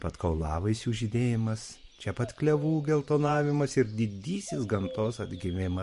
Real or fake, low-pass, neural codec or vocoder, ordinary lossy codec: fake; 14.4 kHz; vocoder, 44.1 kHz, 128 mel bands every 512 samples, BigVGAN v2; MP3, 48 kbps